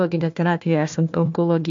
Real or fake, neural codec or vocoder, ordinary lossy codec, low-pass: fake; codec, 16 kHz, 1 kbps, FunCodec, trained on Chinese and English, 50 frames a second; MP3, 48 kbps; 7.2 kHz